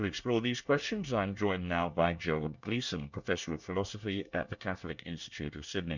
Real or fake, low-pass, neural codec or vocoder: fake; 7.2 kHz; codec, 24 kHz, 1 kbps, SNAC